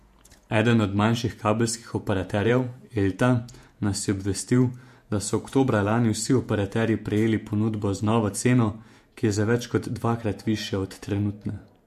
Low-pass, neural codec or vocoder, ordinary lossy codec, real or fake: 14.4 kHz; vocoder, 48 kHz, 128 mel bands, Vocos; MP3, 64 kbps; fake